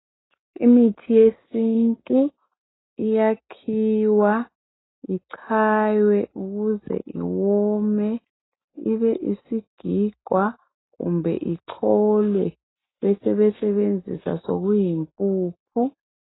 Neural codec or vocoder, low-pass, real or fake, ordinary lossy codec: none; 7.2 kHz; real; AAC, 16 kbps